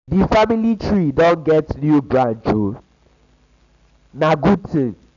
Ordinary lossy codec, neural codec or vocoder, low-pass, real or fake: none; none; 7.2 kHz; real